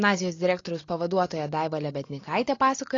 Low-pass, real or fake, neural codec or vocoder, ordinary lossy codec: 7.2 kHz; real; none; AAC, 32 kbps